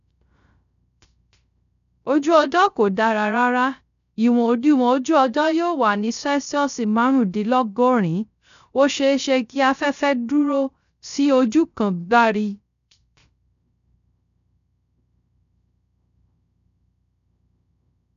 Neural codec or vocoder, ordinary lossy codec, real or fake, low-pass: codec, 16 kHz, 0.3 kbps, FocalCodec; none; fake; 7.2 kHz